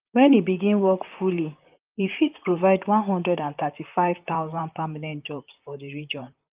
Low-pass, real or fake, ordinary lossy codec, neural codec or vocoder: 3.6 kHz; fake; Opus, 24 kbps; vocoder, 44.1 kHz, 128 mel bands, Pupu-Vocoder